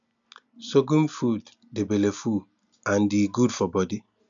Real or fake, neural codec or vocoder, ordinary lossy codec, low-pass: real; none; MP3, 96 kbps; 7.2 kHz